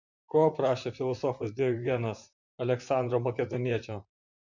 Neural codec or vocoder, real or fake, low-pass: vocoder, 44.1 kHz, 128 mel bands, Pupu-Vocoder; fake; 7.2 kHz